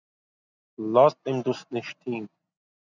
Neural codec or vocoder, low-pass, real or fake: none; 7.2 kHz; real